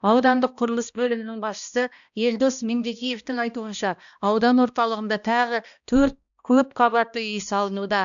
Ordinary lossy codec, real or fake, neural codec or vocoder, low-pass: none; fake; codec, 16 kHz, 1 kbps, X-Codec, HuBERT features, trained on balanced general audio; 7.2 kHz